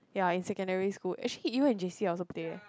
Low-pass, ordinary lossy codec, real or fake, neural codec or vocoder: none; none; real; none